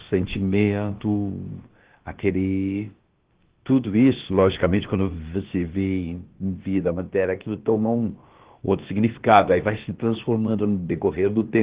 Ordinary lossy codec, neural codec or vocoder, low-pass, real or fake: Opus, 16 kbps; codec, 16 kHz, about 1 kbps, DyCAST, with the encoder's durations; 3.6 kHz; fake